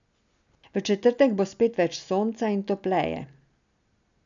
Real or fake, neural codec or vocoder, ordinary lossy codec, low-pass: real; none; none; 7.2 kHz